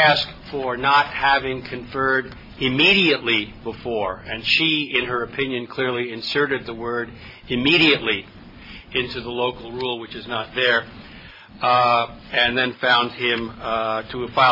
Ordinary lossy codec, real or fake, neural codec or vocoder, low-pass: MP3, 24 kbps; real; none; 5.4 kHz